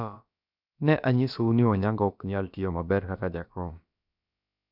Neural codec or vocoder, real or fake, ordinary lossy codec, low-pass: codec, 16 kHz, about 1 kbps, DyCAST, with the encoder's durations; fake; none; 5.4 kHz